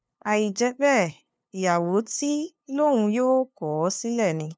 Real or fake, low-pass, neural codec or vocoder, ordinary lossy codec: fake; none; codec, 16 kHz, 2 kbps, FunCodec, trained on LibriTTS, 25 frames a second; none